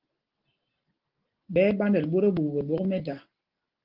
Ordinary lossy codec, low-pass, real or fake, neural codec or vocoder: Opus, 16 kbps; 5.4 kHz; real; none